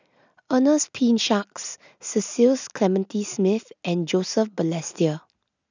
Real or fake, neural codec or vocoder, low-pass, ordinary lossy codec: real; none; 7.2 kHz; none